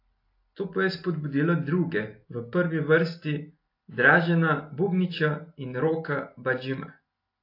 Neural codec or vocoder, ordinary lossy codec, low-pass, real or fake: none; AAC, 32 kbps; 5.4 kHz; real